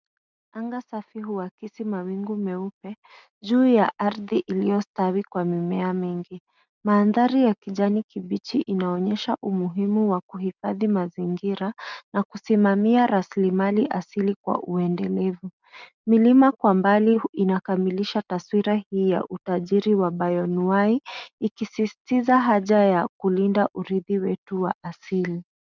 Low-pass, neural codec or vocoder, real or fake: 7.2 kHz; none; real